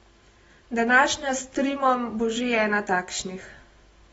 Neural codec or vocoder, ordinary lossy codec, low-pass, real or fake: none; AAC, 24 kbps; 19.8 kHz; real